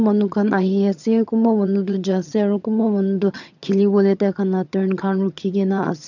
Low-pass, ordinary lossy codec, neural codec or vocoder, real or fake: 7.2 kHz; none; vocoder, 22.05 kHz, 80 mel bands, HiFi-GAN; fake